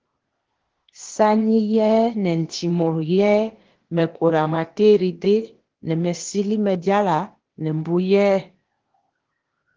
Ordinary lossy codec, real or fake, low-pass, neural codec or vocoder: Opus, 16 kbps; fake; 7.2 kHz; codec, 16 kHz, 0.8 kbps, ZipCodec